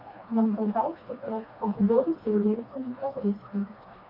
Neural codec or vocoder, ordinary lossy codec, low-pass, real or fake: codec, 16 kHz, 2 kbps, FreqCodec, smaller model; AAC, 32 kbps; 5.4 kHz; fake